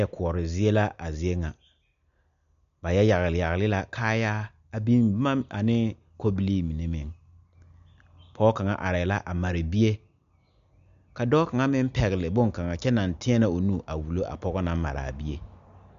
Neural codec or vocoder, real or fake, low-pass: none; real; 7.2 kHz